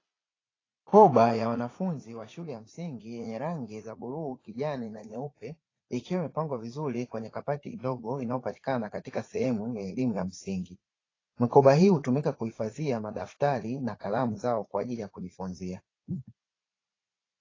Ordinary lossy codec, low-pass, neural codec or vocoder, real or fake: AAC, 32 kbps; 7.2 kHz; vocoder, 44.1 kHz, 80 mel bands, Vocos; fake